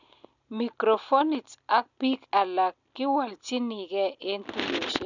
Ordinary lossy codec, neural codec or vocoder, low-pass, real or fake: none; none; 7.2 kHz; real